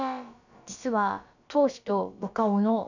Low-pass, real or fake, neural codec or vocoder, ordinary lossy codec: 7.2 kHz; fake; codec, 16 kHz, about 1 kbps, DyCAST, with the encoder's durations; none